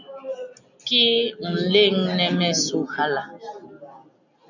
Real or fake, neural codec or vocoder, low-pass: real; none; 7.2 kHz